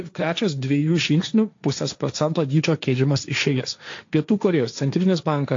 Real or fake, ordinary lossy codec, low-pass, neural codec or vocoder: fake; AAC, 48 kbps; 7.2 kHz; codec, 16 kHz, 1.1 kbps, Voila-Tokenizer